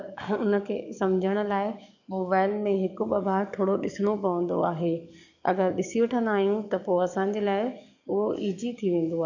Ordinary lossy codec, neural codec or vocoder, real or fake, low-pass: none; codec, 44.1 kHz, 7.8 kbps, DAC; fake; 7.2 kHz